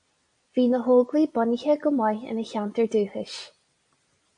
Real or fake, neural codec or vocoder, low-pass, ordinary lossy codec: real; none; 9.9 kHz; MP3, 48 kbps